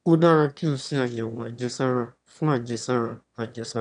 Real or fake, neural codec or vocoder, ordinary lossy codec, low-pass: fake; autoencoder, 22.05 kHz, a latent of 192 numbers a frame, VITS, trained on one speaker; none; 9.9 kHz